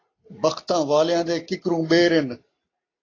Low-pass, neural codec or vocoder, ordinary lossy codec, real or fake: 7.2 kHz; none; AAC, 32 kbps; real